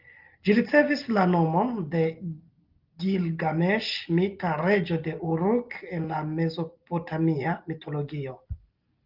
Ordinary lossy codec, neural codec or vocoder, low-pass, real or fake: Opus, 32 kbps; none; 5.4 kHz; real